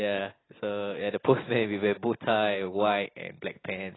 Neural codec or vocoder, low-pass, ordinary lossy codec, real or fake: none; 7.2 kHz; AAC, 16 kbps; real